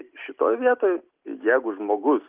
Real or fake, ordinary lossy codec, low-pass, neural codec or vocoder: real; Opus, 32 kbps; 3.6 kHz; none